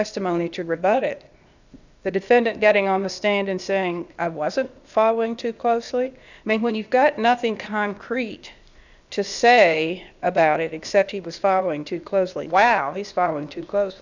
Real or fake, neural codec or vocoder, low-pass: fake; codec, 16 kHz, 0.8 kbps, ZipCodec; 7.2 kHz